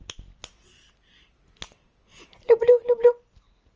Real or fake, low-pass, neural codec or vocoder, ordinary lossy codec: real; 7.2 kHz; none; Opus, 24 kbps